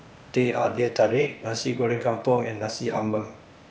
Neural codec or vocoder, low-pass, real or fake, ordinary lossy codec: codec, 16 kHz, 0.8 kbps, ZipCodec; none; fake; none